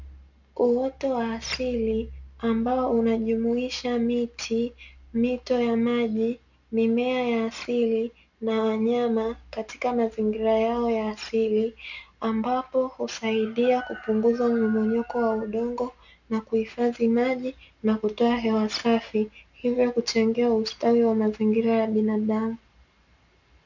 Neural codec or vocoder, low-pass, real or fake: none; 7.2 kHz; real